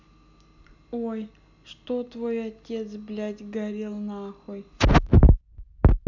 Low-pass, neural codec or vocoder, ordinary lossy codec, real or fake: 7.2 kHz; none; none; real